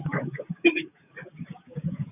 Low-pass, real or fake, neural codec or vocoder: 3.6 kHz; fake; codec, 16 kHz, 6 kbps, DAC